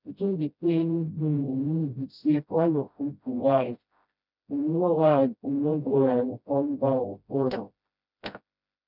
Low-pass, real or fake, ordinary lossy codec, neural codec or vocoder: 5.4 kHz; fake; none; codec, 16 kHz, 0.5 kbps, FreqCodec, smaller model